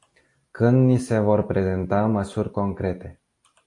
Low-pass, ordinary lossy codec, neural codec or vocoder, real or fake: 10.8 kHz; AAC, 48 kbps; none; real